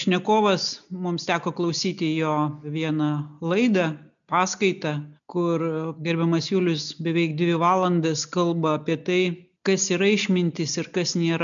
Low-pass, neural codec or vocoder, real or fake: 7.2 kHz; none; real